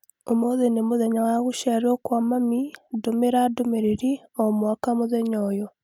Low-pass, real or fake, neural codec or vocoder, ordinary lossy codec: 19.8 kHz; real; none; none